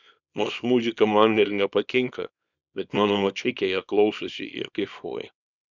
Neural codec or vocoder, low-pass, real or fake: codec, 24 kHz, 0.9 kbps, WavTokenizer, small release; 7.2 kHz; fake